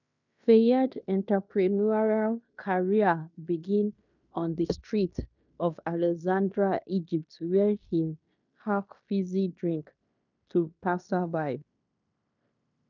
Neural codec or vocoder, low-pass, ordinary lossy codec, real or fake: codec, 16 kHz in and 24 kHz out, 0.9 kbps, LongCat-Audio-Codec, fine tuned four codebook decoder; 7.2 kHz; none; fake